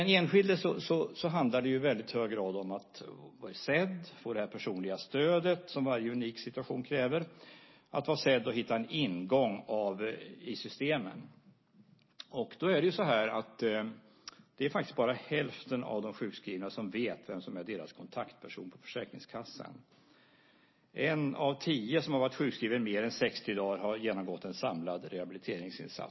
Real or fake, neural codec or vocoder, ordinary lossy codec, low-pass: real; none; MP3, 24 kbps; 7.2 kHz